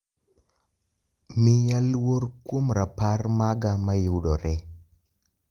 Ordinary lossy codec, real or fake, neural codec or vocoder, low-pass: Opus, 24 kbps; real; none; 19.8 kHz